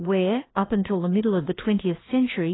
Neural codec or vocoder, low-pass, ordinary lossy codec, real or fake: codec, 16 kHz, 2 kbps, FreqCodec, larger model; 7.2 kHz; AAC, 16 kbps; fake